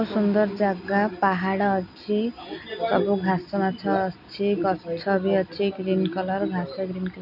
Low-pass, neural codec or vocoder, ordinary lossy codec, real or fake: 5.4 kHz; none; none; real